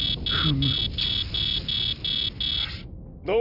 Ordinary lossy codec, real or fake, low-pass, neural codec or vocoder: none; real; 5.4 kHz; none